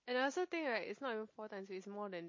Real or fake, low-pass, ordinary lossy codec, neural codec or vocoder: real; 7.2 kHz; MP3, 32 kbps; none